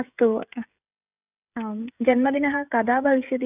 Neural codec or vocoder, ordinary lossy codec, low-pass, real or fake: codec, 16 kHz, 16 kbps, FreqCodec, smaller model; none; 3.6 kHz; fake